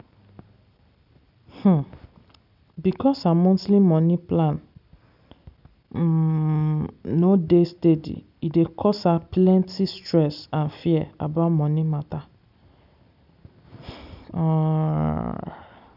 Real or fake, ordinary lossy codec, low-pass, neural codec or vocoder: real; none; 5.4 kHz; none